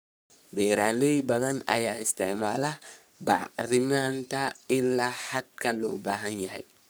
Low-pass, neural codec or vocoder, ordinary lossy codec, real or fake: none; codec, 44.1 kHz, 3.4 kbps, Pupu-Codec; none; fake